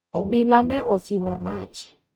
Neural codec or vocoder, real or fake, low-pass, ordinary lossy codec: codec, 44.1 kHz, 0.9 kbps, DAC; fake; 19.8 kHz; none